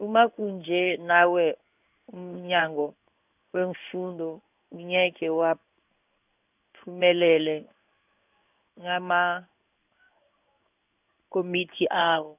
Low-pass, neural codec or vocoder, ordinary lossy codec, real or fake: 3.6 kHz; codec, 16 kHz in and 24 kHz out, 1 kbps, XY-Tokenizer; none; fake